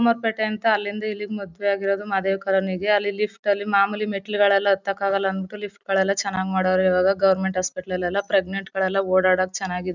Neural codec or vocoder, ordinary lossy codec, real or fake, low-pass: none; none; real; 7.2 kHz